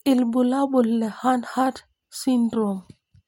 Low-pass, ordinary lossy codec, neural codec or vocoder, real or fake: 19.8 kHz; MP3, 64 kbps; none; real